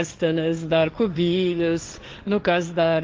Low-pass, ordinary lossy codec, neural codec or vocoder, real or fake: 7.2 kHz; Opus, 32 kbps; codec, 16 kHz, 1.1 kbps, Voila-Tokenizer; fake